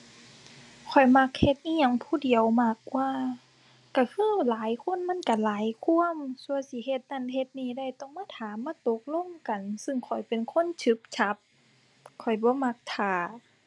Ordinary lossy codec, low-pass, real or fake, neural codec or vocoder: none; none; real; none